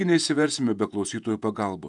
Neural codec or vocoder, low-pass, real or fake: vocoder, 48 kHz, 128 mel bands, Vocos; 10.8 kHz; fake